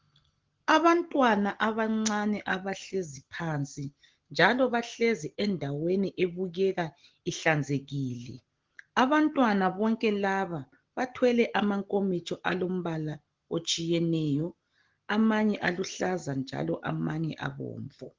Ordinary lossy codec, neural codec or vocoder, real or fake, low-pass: Opus, 16 kbps; none; real; 7.2 kHz